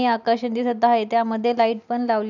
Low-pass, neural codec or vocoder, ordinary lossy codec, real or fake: 7.2 kHz; none; none; real